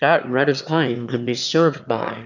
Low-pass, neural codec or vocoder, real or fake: 7.2 kHz; autoencoder, 22.05 kHz, a latent of 192 numbers a frame, VITS, trained on one speaker; fake